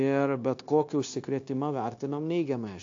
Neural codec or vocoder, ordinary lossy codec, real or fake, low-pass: codec, 16 kHz, 0.9 kbps, LongCat-Audio-Codec; AAC, 48 kbps; fake; 7.2 kHz